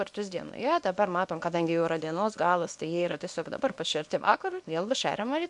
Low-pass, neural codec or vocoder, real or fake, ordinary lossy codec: 10.8 kHz; codec, 24 kHz, 0.9 kbps, WavTokenizer, small release; fake; MP3, 64 kbps